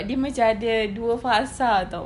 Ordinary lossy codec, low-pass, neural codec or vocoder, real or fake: none; 10.8 kHz; none; real